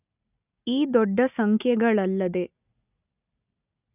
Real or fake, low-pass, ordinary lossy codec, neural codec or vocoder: real; 3.6 kHz; none; none